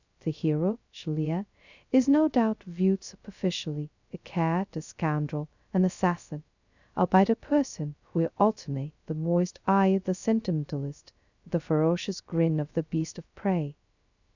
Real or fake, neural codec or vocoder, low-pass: fake; codec, 16 kHz, 0.2 kbps, FocalCodec; 7.2 kHz